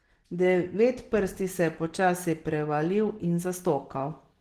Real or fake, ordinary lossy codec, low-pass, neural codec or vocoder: real; Opus, 16 kbps; 14.4 kHz; none